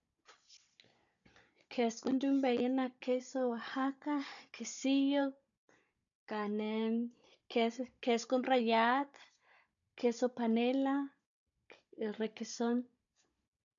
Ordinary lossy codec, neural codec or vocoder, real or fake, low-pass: none; codec, 16 kHz, 16 kbps, FunCodec, trained on Chinese and English, 50 frames a second; fake; 7.2 kHz